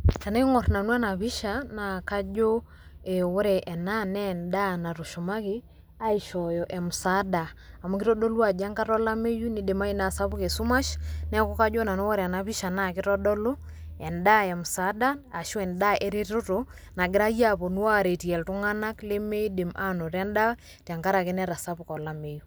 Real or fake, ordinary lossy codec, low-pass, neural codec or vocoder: real; none; none; none